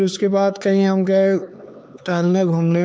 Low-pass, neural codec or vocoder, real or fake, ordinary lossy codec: none; codec, 16 kHz, 4 kbps, X-Codec, HuBERT features, trained on LibriSpeech; fake; none